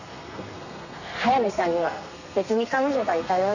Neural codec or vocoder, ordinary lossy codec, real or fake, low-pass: codec, 32 kHz, 1.9 kbps, SNAC; none; fake; 7.2 kHz